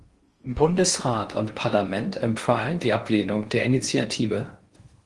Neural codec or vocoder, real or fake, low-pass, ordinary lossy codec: codec, 16 kHz in and 24 kHz out, 0.6 kbps, FocalCodec, streaming, 4096 codes; fake; 10.8 kHz; Opus, 24 kbps